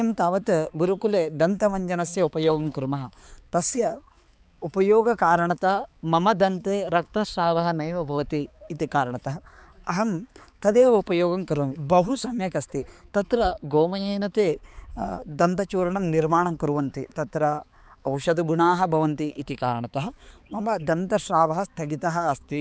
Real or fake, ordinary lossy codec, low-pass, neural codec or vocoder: fake; none; none; codec, 16 kHz, 4 kbps, X-Codec, HuBERT features, trained on balanced general audio